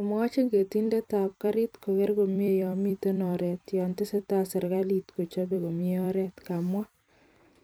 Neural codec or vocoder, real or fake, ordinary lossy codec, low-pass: vocoder, 44.1 kHz, 128 mel bands every 256 samples, BigVGAN v2; fake; none; none